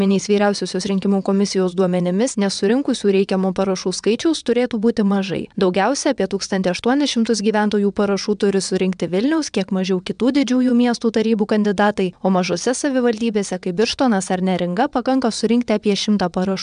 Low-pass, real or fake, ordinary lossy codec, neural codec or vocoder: 9.9 kHz; fake; MP3, 96 kbps; vocoder, 22.05 kHz, 80 mel bands, WaveNeXt